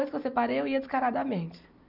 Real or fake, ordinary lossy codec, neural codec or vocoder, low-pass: real; none; none; 5.4 kHz